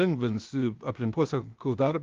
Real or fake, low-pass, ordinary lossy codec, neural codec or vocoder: fake; 7.2 kHz; Opus, 32 kbps; codec, 16 kHz, 0.8 kbps, ZipCodec